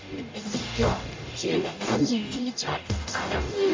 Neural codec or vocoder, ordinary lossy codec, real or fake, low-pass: codec, 44.1 kHz, 0.9 kbps, DAC; MP3, 48 kbps; fake; 7.2 kHz